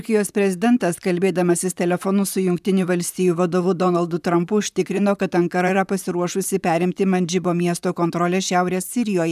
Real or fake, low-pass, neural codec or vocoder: fake; 14.4 kHz; vocoder, 44.1 kHz, 128 mel bands, Pupu-Vocoder